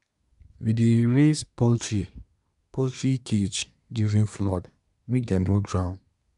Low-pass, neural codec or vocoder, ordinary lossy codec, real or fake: 10.8 kHz; codec, 24 kHz, 1 kbps, SNAC; none; fake